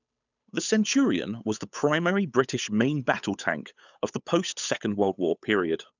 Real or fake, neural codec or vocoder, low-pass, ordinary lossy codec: fake; codec, 16 kHz, 8 kbps, FunCodec, trained on Chinese and English, 25 frames a second; 7.2 kHz; none